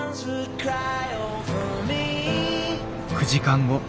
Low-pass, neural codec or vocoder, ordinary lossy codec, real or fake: none; none; none; real